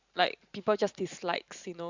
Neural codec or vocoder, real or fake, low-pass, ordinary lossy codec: vocoder, 44.1 kHz, 128 mel bands every 512 samples, BigVGAN v2; fake; 7.2 kHz; Opus, 64 kbps